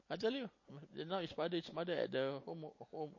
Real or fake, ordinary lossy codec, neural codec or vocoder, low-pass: real; MP3, 32 kbps; none; 7.2 kHz